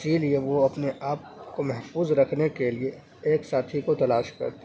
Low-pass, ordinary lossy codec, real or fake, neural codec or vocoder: none; none; real; none